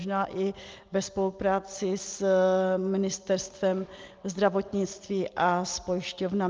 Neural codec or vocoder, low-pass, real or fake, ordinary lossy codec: none; 7.2 kHz; real; Opus, 24 kbps